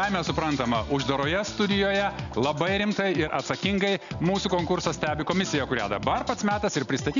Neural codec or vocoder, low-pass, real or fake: none; 7.2 kHz; real